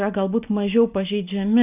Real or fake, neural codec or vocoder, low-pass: real; none; 3.6 kHz